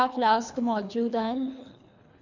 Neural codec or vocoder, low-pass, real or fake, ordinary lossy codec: codec, 24 kHz, 3 kbps, HILCodec; 7.2 kHz; fake; none